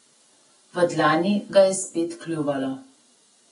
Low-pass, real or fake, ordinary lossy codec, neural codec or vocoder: 10.8 kHz; real; AAC, 32 kbps; none